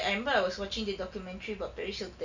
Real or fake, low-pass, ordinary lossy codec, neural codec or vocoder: real; 7.2 kHz; none; none